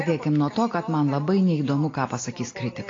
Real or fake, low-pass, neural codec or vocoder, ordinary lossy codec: real; 7.2 kHz; none; AAC, 32 kbps